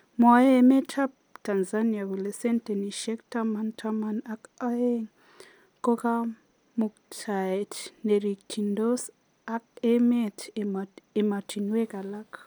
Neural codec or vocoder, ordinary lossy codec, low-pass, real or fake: none; none; none; real